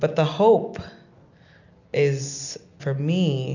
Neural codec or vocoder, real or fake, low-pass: none; real; 7.2 kHz